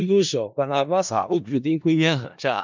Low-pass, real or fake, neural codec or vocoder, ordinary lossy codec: 7.2 kHz; fake; codec, 16 kHz in and 24 kHz out, 0.4 kbps, LongCat-Audio-Codec, four codebook decoder; MP3, 64 kbps